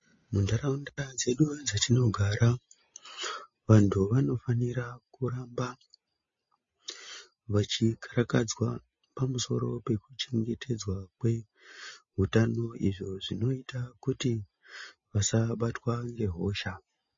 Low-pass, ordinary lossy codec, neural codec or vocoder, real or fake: 7.2 kHz; MP3, 32 kbps; none; real